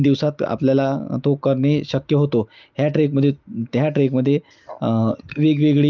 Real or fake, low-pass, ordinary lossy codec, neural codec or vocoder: real; 7.2 kHz; Opus, 24 kbps; none